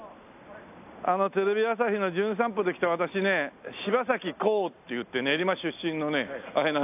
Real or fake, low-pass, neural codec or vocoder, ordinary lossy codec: real; 3.6 kHz; none; AAC, 32 kbps